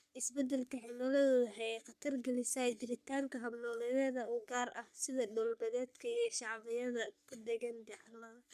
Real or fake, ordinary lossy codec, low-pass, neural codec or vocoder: fake; none; 14.4 kHz; codec, 44.1 kHz, 3.4 kbps, Pupu-Codec